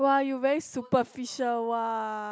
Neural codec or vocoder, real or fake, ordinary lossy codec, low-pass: none; real; none; none